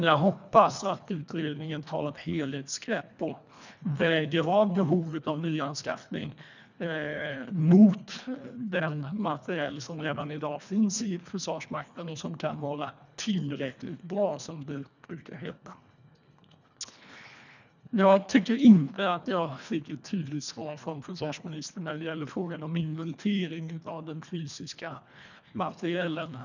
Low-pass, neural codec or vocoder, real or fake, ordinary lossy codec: 7.2 kHz; codec, 24 kHz, 1.5 kbps, HILCodec; fake; none